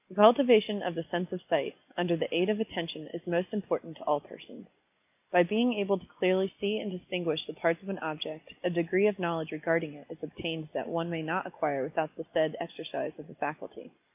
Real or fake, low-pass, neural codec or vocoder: real; 3.6 kHz; none